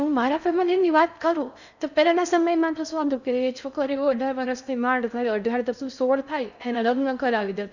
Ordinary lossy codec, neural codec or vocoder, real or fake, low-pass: none; codec, 16 kHz in and 24 kHz out, 0.6 kbps, FocalCodec, streaming, 4096 codes; fake; 7.2 kHz